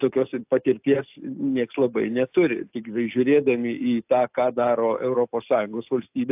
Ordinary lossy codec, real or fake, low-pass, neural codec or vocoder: AAC, 32 kbps; real; 3.6 kHz; none